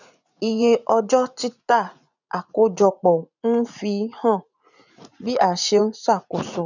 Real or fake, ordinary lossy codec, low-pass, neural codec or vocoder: fake; none; 7.2 kHz; vocoder, 44.1 kHz, 80 mel bands, Vocos